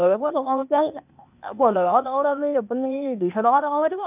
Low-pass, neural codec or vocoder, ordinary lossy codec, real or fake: 3.6 kHz; codec, 16 kHz, 0.8 kbps, ZipCodec; none; fake